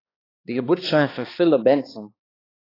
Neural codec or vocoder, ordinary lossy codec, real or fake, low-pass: codec, 16 kHz, 2 kbps, X-Codec, HuBERT features, trained on balanced general audio; AAC, 24 kbps; fake; 5.4 kHz